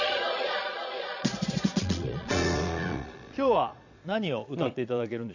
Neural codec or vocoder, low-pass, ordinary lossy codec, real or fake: vocoder, 22.05 kHz, 80 mel bands, Vocos; 7.2 kHz; none; fake